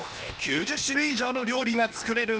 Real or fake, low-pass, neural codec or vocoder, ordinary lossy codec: fake; none; codec, 16 kHz, 0.8 kbps, ZipCodec; none